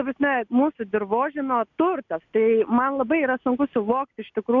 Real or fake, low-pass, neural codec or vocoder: real; 7.2 kHz; none